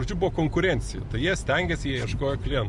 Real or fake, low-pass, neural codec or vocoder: real; 10.8 kHz; none